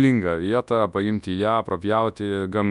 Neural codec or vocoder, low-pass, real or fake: codec, 24 kHz, 0.9 kbps, WavTokenizer, large speech release; 10.8 kHz; fake